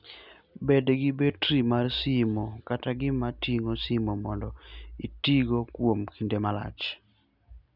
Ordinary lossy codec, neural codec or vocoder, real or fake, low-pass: none; none; real; 5.4 kHz